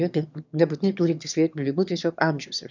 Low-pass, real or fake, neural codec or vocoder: 7.2 kHz; fake; autoencoder, 22.05 kHz, a latent of 192 numbers a frame, VITS, trained on one speaker